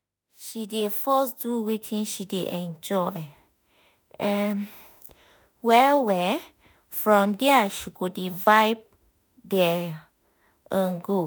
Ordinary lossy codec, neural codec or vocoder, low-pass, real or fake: none; autoencoder, 48 kHz, 32 numbers a frame, DAC-VAE, trained on Japanese speech; none; fake